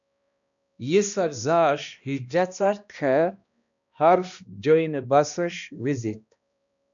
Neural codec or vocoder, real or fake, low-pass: codec, 16 kHz, 1 kbps, X-Codec, HuBERT features, trained on balanced general audio; fake; 7.2 kHz